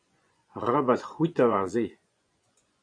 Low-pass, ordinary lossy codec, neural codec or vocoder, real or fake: 9.9 kHz; MP3, 48 kbps; vocoder, 24 kHz, 100 mel bands, Vocos; fake